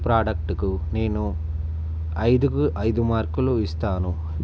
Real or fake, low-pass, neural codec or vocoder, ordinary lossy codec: real; none; none; none